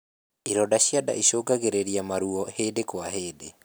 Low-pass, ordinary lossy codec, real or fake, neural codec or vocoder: none; none; real; none